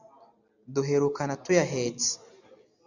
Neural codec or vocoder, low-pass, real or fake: none; 7.2 kHz; real